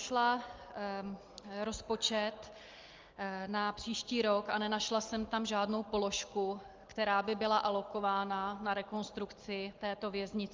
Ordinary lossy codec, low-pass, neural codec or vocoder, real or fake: Opus, 32 kbps; 7.2 kHz; none; real